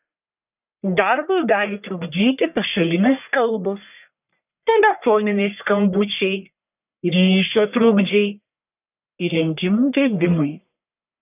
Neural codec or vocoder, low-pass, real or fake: codec, 44.1 kHz, 1.7 kbps, Pupu-Codec; 3.6 kHz; fake